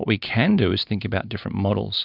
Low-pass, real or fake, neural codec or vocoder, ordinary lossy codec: 5.4 kHz; real; none; Opus, 64 kbps